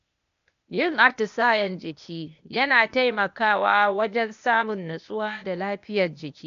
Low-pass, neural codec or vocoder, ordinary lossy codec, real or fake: 7.2 kHz; codec, 16 kHz, 0.8 kbps, ZipCodec; none; fake